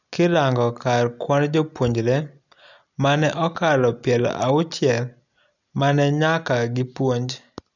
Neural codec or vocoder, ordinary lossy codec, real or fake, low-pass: none; none; real; 7.2 kHz